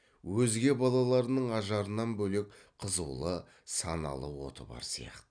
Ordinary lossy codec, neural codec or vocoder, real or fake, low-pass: none; none; real; 9.9 kHz